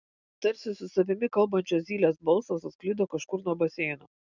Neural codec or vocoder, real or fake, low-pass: none; real; 7.2 kHz